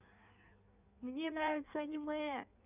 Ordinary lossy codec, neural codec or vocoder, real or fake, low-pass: none; codec, 16 kHz in and 24 kHz out, 1.1 kbps, FireRedTTS-2 codec; fake; 3.6 kHz